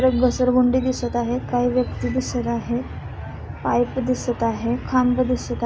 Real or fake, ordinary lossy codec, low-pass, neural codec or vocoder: real; none; none; none